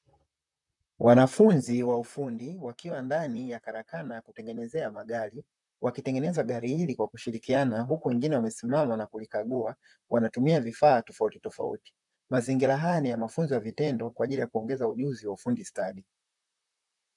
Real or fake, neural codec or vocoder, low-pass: fake; vocoder, 44.1 kHz, 128 mel bands, Pupu-Vocoder; 10.8 kHz